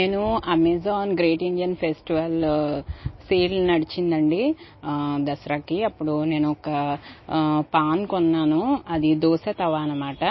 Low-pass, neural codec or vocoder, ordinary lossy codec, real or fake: 7.2 kHz; none; MP3, 24 kbps; real